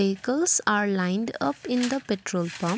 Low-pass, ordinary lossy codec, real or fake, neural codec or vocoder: none; none; real; none